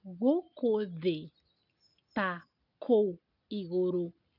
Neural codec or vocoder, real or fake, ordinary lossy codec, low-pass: none; real; none; 5.4 kHz